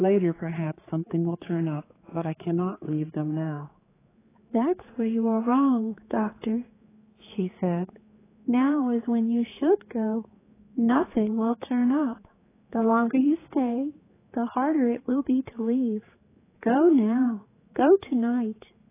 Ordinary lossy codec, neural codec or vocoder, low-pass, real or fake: AAC, 16 kbps; codec, 16 kHz, 4 kbps, X-Codec, HuBERT features, trained on general audio; 3.6 kHz; fake